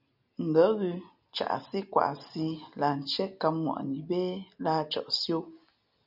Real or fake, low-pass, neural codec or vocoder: real; 5.4 kHz; none